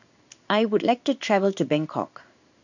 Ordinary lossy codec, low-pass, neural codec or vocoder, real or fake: none; 7.2 kHz; codec, 16 kHz in and 24 kHz out, 1 kbps, XY-Tokenizer; fake